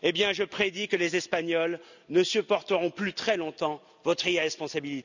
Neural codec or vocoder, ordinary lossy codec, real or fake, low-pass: none; none; real; 7.2 kHz